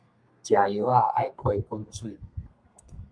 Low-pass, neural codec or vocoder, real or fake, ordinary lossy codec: 9.9 kHz; codec, 32 kHz, 1.9 kbps, SNAC; fake; MP3, 64 kbps